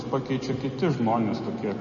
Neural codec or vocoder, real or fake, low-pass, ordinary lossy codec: none; real; 7.2 kHz; MP3, 32 kbps